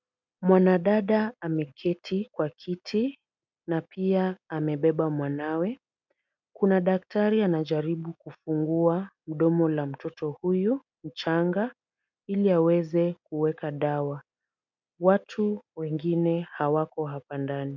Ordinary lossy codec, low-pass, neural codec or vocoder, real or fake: MP3, 64 kbps; 7.2 kHz; none; real